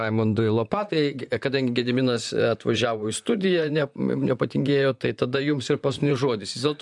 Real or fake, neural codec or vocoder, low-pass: fake; vocoder, 44.1 kHz, 128 mel bands, Pupu-Vocoder; 10.8 kHz